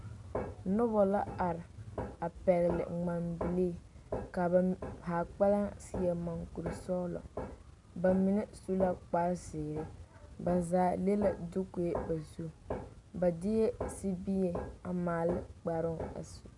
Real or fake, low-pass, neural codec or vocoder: real; 10.8 kHz; none